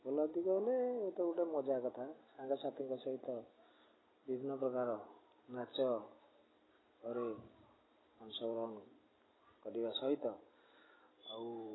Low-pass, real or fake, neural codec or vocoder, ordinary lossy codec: 7.2 kHz; real; none; AAC, 16 kbps